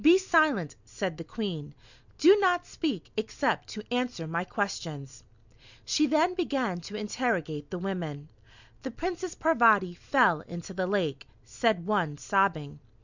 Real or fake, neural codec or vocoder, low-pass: real; none; 7.2 kHz